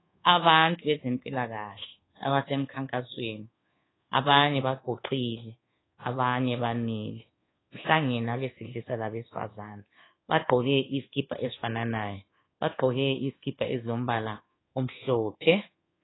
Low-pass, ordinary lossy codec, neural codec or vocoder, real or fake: 7.2 kHz; AAC, 16 kbps; codec, 24 kHz, 1.2 kbps, DualCodec; fake